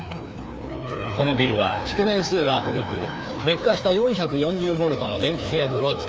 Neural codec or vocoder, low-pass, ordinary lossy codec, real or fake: codec, 16 kHz, 2 kbps, FreqCodec, larger model; none; none; fake